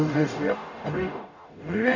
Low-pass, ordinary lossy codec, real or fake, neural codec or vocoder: 7.2 kHz; none; fake; codec, 44.1 kHz, 0.9 kbps, DAC